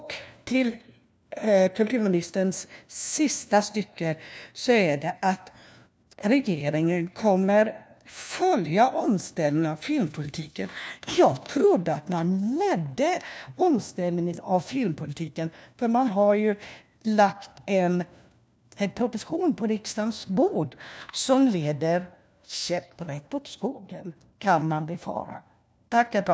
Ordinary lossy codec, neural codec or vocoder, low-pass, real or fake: none; codec, 16 kHz, 1 kbps, FunCodec, trained on LibriTTS, 50 frames a second; none; fake